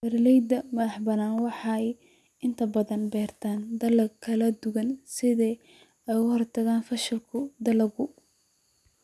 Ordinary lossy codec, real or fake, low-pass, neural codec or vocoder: none; real; none; none